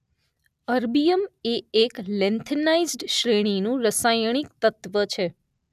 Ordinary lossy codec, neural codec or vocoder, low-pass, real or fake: none; none; 14.4 kHz; real